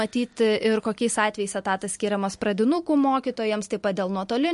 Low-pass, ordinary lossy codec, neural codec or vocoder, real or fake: 14.4 kHz; MP3, 48 kbps; none; real